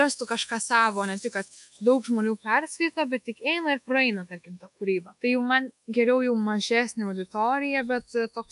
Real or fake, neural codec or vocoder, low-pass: fake; codec, 24 kHz, 1.2 kbps, DualCodec; 10.8 kHz